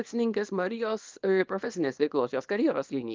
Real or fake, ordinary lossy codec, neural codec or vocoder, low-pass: fake; Opus, 24 kbps; codec, 24 kHz, 0.9 kbps, WavTokenizer, small release; 7.2 kHz